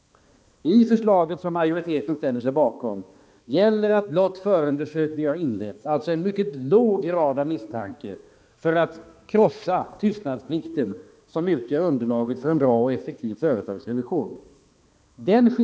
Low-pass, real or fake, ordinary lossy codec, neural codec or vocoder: none; fake; none; codec, 16 kHz, 2 kbps, X-Codec, HuBERT features, trained on balanced general audio